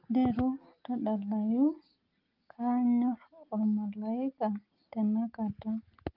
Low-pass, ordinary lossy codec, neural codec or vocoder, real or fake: 5.4 kHz; Opus, 32 kbps; none; real